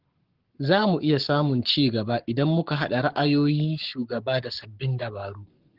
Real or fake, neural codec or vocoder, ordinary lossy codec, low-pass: fake; codec, 44.1 kHz, 7.8 kbps, Pupu-Codec; Opus, 16 kbps; 5.4 kHz